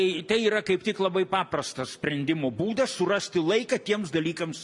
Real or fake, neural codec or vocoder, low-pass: real; none; 10.8 kHz